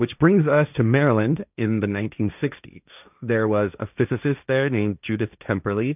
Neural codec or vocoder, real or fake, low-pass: codec, 16 kHz, 1.1 kbps, Voila-Tokenizer; fake; 3.6 kHz